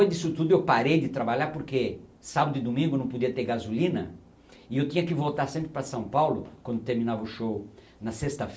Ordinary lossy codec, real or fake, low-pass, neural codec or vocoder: none; real; none; none